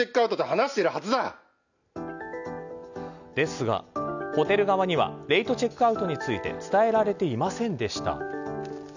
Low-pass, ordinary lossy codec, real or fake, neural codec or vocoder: 7.2 kHz; none; real; none